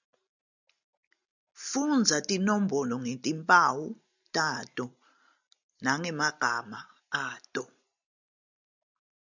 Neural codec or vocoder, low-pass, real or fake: none; 7.2 kHz; real